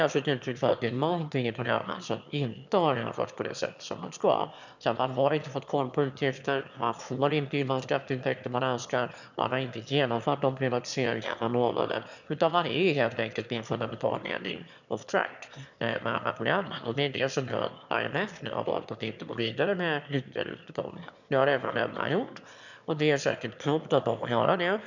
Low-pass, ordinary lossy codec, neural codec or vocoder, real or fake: 7.2 kHz; none; autoencoder, 22.05 kHz, a latent of 192 numbers a frame, VITS, trained on one speaker; fake